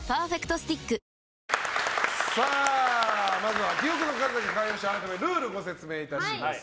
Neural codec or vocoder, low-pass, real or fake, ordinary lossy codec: none; none; real; none